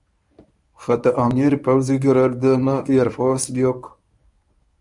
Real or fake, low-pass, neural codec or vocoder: fake; 10.8 kHz; codec, 24 kHz, 0.9 kbps, WavTokenizer, medium speech release version 1